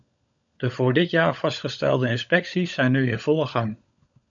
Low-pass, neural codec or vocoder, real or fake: 7.2 kHz; codec, 16 kHz, 16 kbps, FunCodec, trained on LibriTTS, 50 frames a second; fake